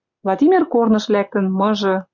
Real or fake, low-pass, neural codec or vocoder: real; 7.2 kHz; none